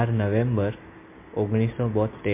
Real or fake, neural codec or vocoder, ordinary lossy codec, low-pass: real; none; MP3, 24 kbps; 3.6 kHz